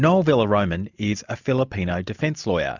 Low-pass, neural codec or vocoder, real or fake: 7.2 kHz; none; real